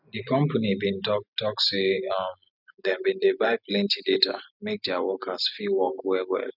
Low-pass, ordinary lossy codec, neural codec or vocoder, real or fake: 5.4 kHz; none; none; real